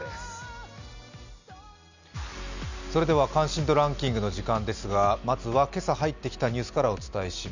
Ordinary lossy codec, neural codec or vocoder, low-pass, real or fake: none; none; 7.2 kHz; real